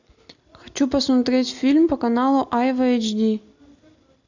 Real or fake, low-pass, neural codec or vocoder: real; 7.2 kHz; none